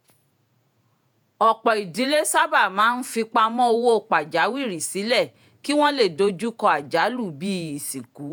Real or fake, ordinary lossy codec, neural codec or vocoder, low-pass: fake; none; vocoder, 48 kHz, 128 mel bands, Vocos; none